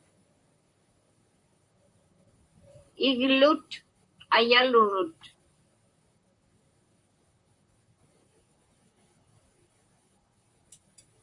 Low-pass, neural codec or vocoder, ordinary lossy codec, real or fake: 10.8 kHz; vocoder, 44.1 kHz, 128 mel bands, Pupu-Vocoder; MP3, 64 kbps; fake